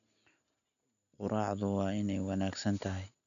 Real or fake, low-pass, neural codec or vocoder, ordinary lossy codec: real; 7.2 kHz; none; MP3, 96 kbps